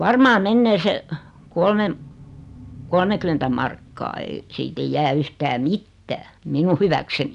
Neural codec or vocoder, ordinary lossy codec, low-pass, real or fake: none; none; 10.8 kHz; real